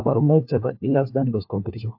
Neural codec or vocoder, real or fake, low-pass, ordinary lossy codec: codec, 16 kHz, 1 kbps, FunCodec, trained on LibriTTS, 50 frames a second; fake; 5.4 kHz; AAC, 48 kbps